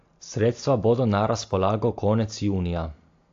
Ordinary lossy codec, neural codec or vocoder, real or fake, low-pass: AAC, 48 kbps; none; real; 7.2 kHz